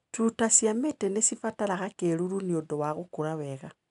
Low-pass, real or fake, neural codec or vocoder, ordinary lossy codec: 10.8 kHz; real; none; MP3, 96 kbps